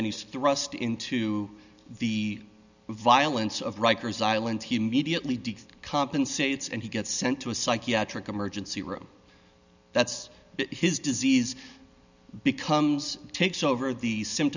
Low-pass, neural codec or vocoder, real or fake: 7.2 kHz; none; real